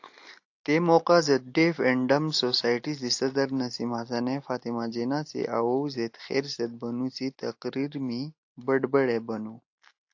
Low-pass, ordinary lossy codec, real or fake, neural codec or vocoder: 7.2 kHz; AAC, 48 kbps; real; none